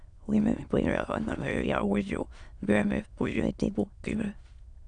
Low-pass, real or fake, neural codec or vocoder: 9.9 kHz; fake; autoencoder, 22.05 kHz, a latent of 192 numbers a frame, VITS, trained on many speakers